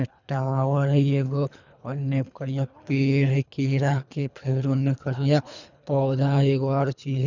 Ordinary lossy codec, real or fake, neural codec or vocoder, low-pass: none; fake; codec, 24 kHz, 3 kbps, HILCodec; 7.2 kHz